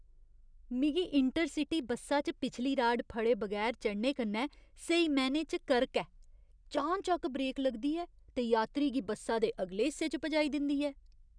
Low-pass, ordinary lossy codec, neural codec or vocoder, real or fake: 9.9 kHz; none; none; real